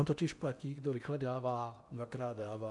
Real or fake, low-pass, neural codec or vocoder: fake; 10.8 kHz; codec, 16 kHz in and 24 kHz out, 0.8 kbps, FocalCodec, streaming, 65536 codes